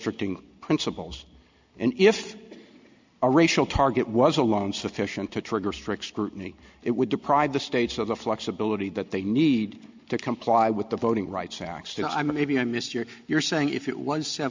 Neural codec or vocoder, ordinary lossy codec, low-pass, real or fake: none; MP3, 64 kbps; 7.2 kHz; real